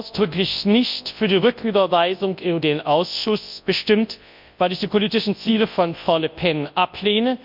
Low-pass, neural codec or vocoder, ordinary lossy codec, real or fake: 5.4 kHz; codec, 24 kHz, 0.9 kbps, WavTokenizer, large speech release; MP3, 48 kbps; fake